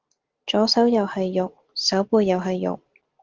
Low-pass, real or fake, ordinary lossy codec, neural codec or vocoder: 7.2 kHz; real; Opus, 16 kbps; none